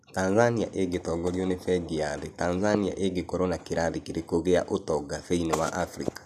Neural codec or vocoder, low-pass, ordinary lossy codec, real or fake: none; 14.4 kHz; none; real